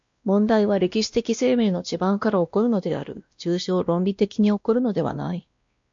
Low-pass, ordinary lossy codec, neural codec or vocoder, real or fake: 7.2 kHz; MP3, 48 kbps; codec, 16 kHz, 1 kbps, X-Codec, WavLM features, trained on Multilingual LibriSpeech; fake